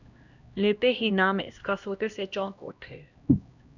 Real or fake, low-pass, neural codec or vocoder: fake; 7.2 kHz; codec, 16 kHz, 1 kbps, X-Codec, HuBERT features, trained on LibriSpeech